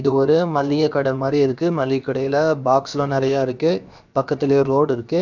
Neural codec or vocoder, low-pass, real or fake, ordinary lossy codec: codec, 16 kHz, about 1 kbps, DyCAST, with the encoder's durations; 7.2 kHz; fake; none